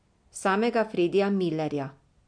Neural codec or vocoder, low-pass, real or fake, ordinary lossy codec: none; 9.9 kHz; real; MP3, 64 kbps